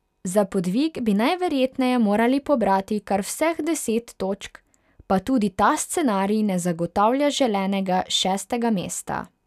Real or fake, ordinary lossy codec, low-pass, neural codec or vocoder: real; none; 14.4 kHz; none